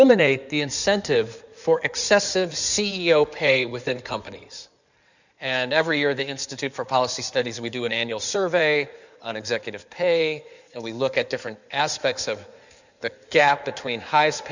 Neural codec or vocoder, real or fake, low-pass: codec, 16 kHz in and 24 kHz out, 2.2 kbps, FireRedTTS-2 codec; fake; 7.2 kHz